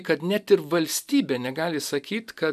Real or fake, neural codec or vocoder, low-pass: real; none; 14.4 kHz